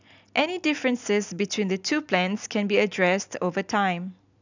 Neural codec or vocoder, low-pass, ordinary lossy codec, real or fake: none; 7.2 kHz; none; real